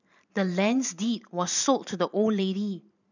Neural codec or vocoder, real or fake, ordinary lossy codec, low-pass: vocoder, 44.1 kHz, 128 mel bands every 512 samples, BigVGAN v2; fake; none; 7.2 kHz